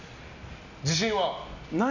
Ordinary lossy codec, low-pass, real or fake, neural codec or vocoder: none; 7.2 kHz; real; none